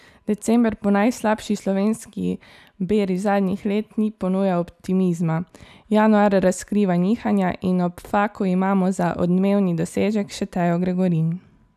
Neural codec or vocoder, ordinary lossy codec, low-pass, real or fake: none; none; 14.4 kHz; real